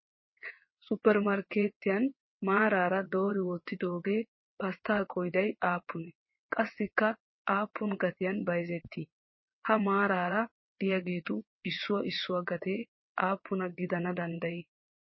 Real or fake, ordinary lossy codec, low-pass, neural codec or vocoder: fake; MP3, 24 kbps; 7.2 kHz; vocoder, 22.05 kHz, 80 mel bands, WaveNeXt